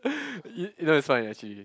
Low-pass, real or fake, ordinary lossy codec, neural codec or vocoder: none; real; none; none